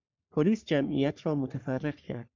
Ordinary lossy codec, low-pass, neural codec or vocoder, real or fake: MP3, 64 kbps; 7.2 kHz; codec, 44.1 kHz, 3.4 kbps, Pupu-Codec; fake